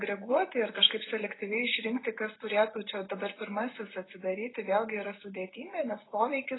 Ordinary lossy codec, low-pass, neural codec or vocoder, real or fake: AAC, 16 kbps; 7.2 kHz; none; real